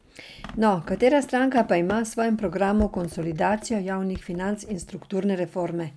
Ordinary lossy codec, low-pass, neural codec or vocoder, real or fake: none; none; none; real